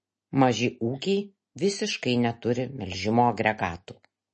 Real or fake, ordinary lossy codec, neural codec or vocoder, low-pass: real; MP3, 32 kbps; none; 9.9 kHz